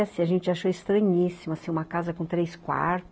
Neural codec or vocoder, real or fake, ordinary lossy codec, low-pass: none; real; none; none